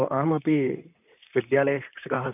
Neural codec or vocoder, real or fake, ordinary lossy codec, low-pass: vocoder, 44.1 kHz, 128 mel bands, Pupu-Vocoder; fake; none; 3.6 kHz